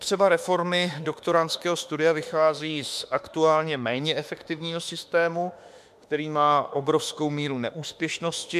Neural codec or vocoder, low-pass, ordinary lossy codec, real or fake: autoencoder, 48 kHz, 32 numbers a frame, DAC-VAE, trained on Japanese speech; 14.4 kHz; MP3, 96 kbps; fake